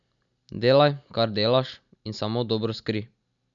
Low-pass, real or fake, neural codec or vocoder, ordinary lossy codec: 7.2 kHz; real; none; MP3, 96 kbps